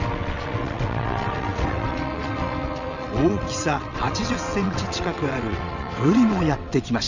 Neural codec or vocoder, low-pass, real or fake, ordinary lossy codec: vocoder, 22.05 kHz, 80 mel bands, WaveNeXt; 7.2 kHz; fake; none